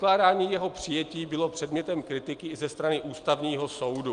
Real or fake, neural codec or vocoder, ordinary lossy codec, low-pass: real; none; Opus, 32 kbps; 9.9 kHz